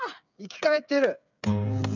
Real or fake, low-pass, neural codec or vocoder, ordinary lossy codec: fake; 7.2 kHz; codec, 16 kHz, 8 kbps, FreqCodec, smaller model; none